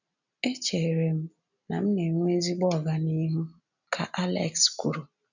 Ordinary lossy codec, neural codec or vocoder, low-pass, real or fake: none; none; 7.2 kHz; real